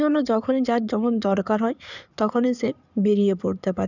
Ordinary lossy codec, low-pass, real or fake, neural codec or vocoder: none; 7.2 kHz; fake; codec, 16 kHz in and 24 kHz out, 2.2 kbps, FireRedTTS-2 codec